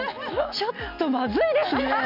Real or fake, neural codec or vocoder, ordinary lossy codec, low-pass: real; none; MP3, 48 kbps; 5.4 kHz